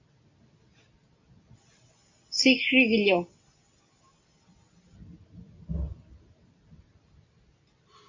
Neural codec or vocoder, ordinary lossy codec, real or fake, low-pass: none; MP3, 48 kbps; real; 7.2 kHz